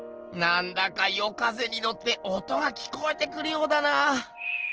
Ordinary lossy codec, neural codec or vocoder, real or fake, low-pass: Opus, 16 kbps; none; real; 7.2 kHz